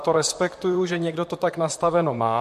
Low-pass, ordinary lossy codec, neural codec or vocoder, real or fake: 14.4 kHz; MP3, 64 kbps; vocoder, 44.1 kHz, 128 mel bands, Pupu-Vocoder; fake